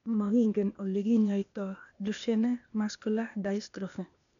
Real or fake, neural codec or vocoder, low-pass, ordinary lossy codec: fake; codec, 16 kHz, 0.8 kbps, ZipCodec; 7.2 kHz; none